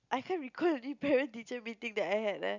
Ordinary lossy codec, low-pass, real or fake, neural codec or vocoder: none; 7.2 kHz; real; none